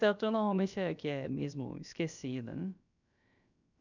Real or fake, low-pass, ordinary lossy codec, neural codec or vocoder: fake; 7.2 kHz; none; codec, 16 kHz, about 1 kbps, DyCAST, with the encoder's durations